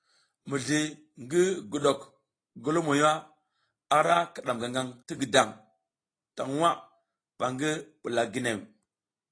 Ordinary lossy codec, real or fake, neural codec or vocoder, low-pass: AAC, 32 kbps; real; none; 9.9 kHz